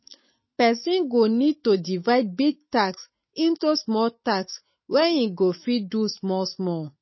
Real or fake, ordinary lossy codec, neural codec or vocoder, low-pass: fake; MP3, 24 kbps; autoencoder, 48 kHz, 128 numbers a frame, DAC-VAE, trained on Japanese speech; 7.2 kHz